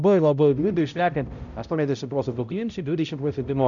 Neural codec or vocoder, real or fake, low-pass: codec, 16 kHz, 0.5 kbps, X-Codec, HuBERT features, trained on balanced general audio; fake; 7.2 kHz